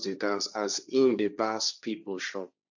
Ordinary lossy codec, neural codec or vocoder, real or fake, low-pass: none; codec, 16 kHz, 1.1 kbps, Voila-Tokenizer; fake; 7.2 kHz